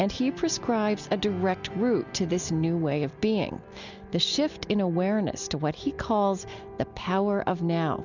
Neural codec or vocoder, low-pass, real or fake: none; 7.2 kHz; real